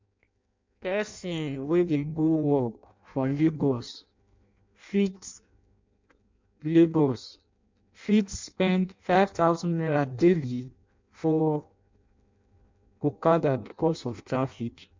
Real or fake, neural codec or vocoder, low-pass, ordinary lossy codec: fake; codec, 16 kHz in and 24 kHz out, 0.6 kbps, FireRedTTS-2 codec; 7.2 kHz; MP3, 64 kbps